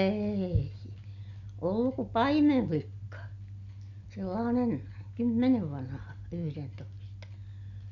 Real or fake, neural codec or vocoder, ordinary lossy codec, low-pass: real; none; none; 7.2 kHz